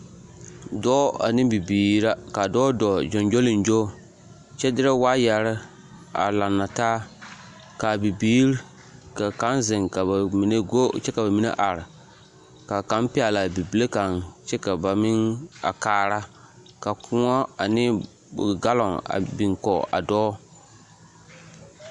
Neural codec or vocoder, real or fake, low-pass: none; real; 10.8 kHz